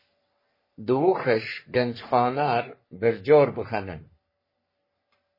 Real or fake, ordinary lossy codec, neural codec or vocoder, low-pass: fake; MP3, 24 kbps; codec, 44.1 kHz, 3.4 kbps, Pupu-Codec; 5.4 kHz